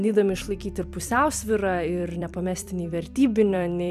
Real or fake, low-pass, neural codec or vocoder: fake; 14.4 kHz; vocoder, 44.1 kHz, 128 mel bands every 256 samples, BigVGAN v2